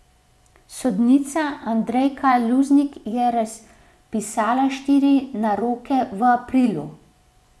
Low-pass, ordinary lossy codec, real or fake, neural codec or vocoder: none; none; fake; vocoder, 24 kHz, 100 mel bands, Vocos